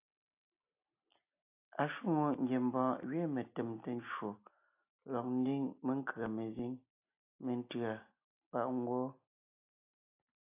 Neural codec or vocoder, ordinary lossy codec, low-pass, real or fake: none; AAC, 24 kbps; 3.6 kHz; real